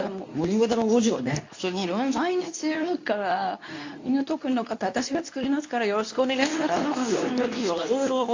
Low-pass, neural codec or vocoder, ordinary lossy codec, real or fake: 7.2 kHz; codec, 24 kHz, 0.9 kbps, WavTokenizer, medium speech release version 2; AAC, 48 kbps; fake